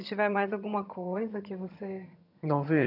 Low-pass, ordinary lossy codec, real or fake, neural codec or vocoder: 5.4 kHz; none; fake; vocoder, 22.05 kHz, 80 mel bands, HiFi-GAN